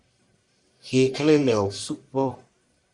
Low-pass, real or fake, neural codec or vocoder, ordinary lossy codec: 10.8 kHz; fake; codec, 44.1 kHz, 1.7 kbps, Pupu-Codec; Opus, 64 kbps